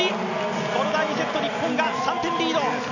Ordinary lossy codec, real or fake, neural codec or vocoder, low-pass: none; real; none; 7.2 kHz